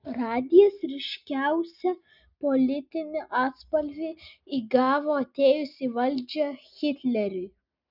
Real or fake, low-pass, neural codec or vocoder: real; 5.4 kHz; none